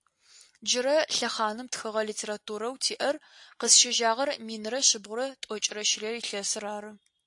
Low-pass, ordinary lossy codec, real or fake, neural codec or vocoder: 10.8 kHz; AAC, 64 kbps; real; none